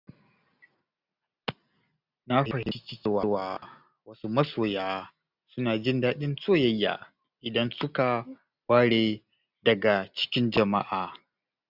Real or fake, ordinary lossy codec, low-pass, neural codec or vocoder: real; none; 5.4 kHz; none